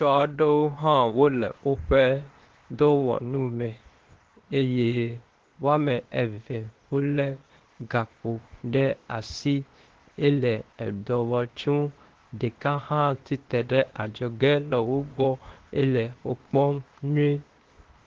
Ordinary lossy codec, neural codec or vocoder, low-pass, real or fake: Opus, 16 kbps; codec, 16 kHz, 0.8 kbps, ZipCodec; 7.2 kHz; fake